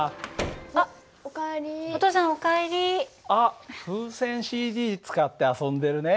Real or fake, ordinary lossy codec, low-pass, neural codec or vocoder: real; none; none; none